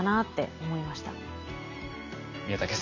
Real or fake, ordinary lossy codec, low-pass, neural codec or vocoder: real; none; 7.2 kHz; none